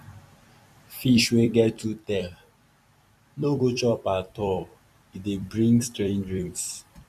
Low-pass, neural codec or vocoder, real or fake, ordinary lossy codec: 14.4 kHz; vocoder, 44.1 kHz, 128 mel bands every 256 samples, BigVGAN v2; fake; none